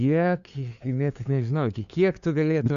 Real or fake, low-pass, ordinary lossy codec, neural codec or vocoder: fake; 7.2 kHz; Opus, 64 kbps; codec, 16 kHz, 2 kbps, FunCodec, trained on LibriTTS, 25 frames a second